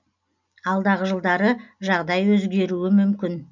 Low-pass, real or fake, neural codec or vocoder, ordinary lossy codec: 7.2 kHz; real; none; none